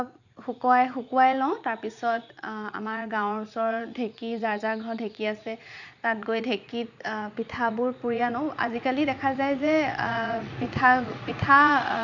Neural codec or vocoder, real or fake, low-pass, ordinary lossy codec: vocoder, 44.1 kHz, 80 mel bands, Vocos; fake; 7.2 kHz; none